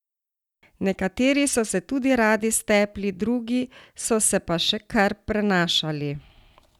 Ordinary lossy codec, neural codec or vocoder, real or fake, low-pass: none; none; real; 19.8 kHz